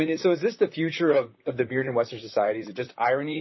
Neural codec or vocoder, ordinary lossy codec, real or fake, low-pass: vocoder, 44.1 kHz, 128 mel bands, Pupu-Vocoder; MP3, 24 kbps; fake; 7.2 kHz